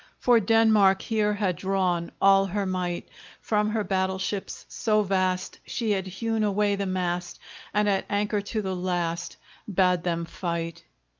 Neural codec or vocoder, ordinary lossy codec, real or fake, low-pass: none; Opus, 24 kbps; real; 7.2 kHz